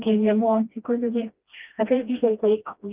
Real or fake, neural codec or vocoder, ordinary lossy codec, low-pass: fake; codec, 16 kHz, 1 kbps, FreqCodec, smaller model; Opus, 24 kbps; 3.6 kHz